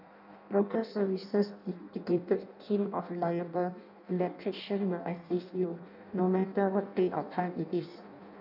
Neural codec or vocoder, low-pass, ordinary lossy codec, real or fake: codec, 16 kHz in and 24 kHz out, 0.6 kbps, FireRedTTS-2 codec; 5.4 kHz; none; fake